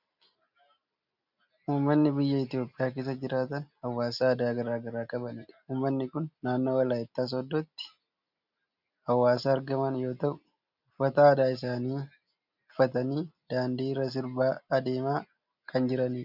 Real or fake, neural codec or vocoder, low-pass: real; none; 5.4 kHz